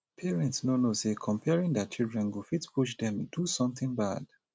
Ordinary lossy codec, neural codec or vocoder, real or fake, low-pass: none; none; real; none